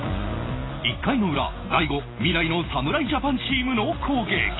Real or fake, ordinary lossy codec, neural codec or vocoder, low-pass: real; AAC, 16 kbps; none; 7.2 kHz